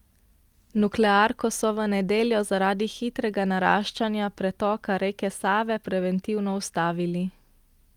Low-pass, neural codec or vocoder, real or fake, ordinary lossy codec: 19.8 kHz; none; real; Opus, 32 kbps